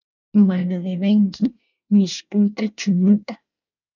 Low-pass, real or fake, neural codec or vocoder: 7.2 kHz; fake; codec, 24 kHz, 1 kbps, SNAC